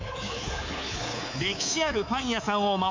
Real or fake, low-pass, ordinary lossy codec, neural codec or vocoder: fake; 7.2 kHz; MP3, 64 kbps; codec, 24 kHz, 3.1 kbps, DualCodec